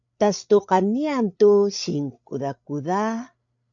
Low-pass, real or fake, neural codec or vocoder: 7.2 kHz; fake; codec, 16 kHz, 8 kbps, FreqCodec, larger model